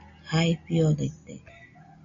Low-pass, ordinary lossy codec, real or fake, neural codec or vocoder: 7.2 kHz; MP3, 96 kbps; real; none